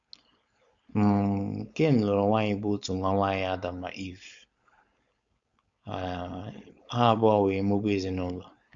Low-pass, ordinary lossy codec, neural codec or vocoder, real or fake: 7.2 kHz; Opus, 64 kbps; codec, 16 kHz, 4.8 kbps, FACodec; fake